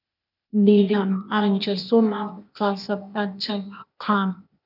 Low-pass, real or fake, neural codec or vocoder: 5.4 kHz; fake; codec, 16 kHz, 0.8 kbps, ZipCodec